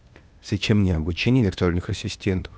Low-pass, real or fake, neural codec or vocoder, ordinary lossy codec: none; fake; codec, 16 kHz, 0.8 kbps, ZipCodec; none